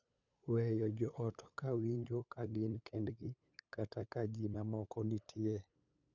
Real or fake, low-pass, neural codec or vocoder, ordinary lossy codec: fake; 7.2 kHz; codec, 16 kHz, 8 kbps, FunCodec, trained on LibriTTS, 25 frames a second; none